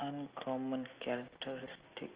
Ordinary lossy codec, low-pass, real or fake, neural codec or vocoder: Opus, 24 kbps; 3.6 kHz; real; none